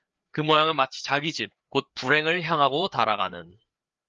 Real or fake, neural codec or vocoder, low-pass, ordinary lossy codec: fake; codec, 16 kHz, 4 kbps, FreqCodec, larger model; 7.2 kHz; Opus, 32 kbps